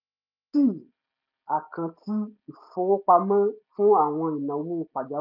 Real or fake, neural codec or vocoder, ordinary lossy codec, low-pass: real; none; none; 5.4 kHz